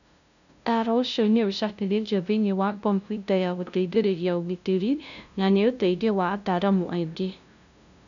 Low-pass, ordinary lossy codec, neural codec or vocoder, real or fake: 7.2 kHz; none; codec, 16 kHz, 0.5 kbps, FunCodec, trained on LibriTTS, 25 frames a second; fake